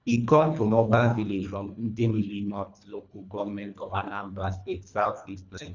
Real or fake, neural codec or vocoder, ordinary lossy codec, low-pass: fake; codec, 24 kHz, 1.5 kbps, HILCodec; none; 7.2 kHz